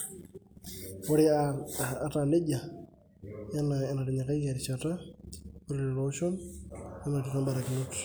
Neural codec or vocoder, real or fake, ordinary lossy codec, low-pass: none; real; none; none